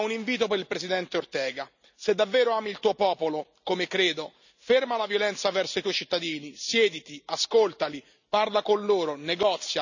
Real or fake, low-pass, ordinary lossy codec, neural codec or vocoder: real; 7.2 kHz; none; none